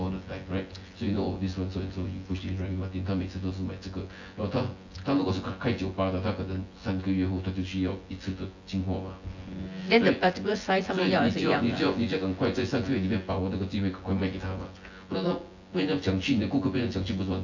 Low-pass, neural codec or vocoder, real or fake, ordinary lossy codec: 7.2 kHz; vocoder, 24 kHz, 100 mel bands, Vocos; fake; AAC, 48 kbps